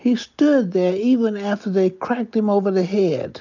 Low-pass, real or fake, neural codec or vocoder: 7.2 kHz; real; none